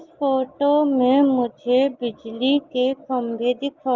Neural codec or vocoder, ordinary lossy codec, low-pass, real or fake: none; Opus, 32 kbps; 7.2 kHz; real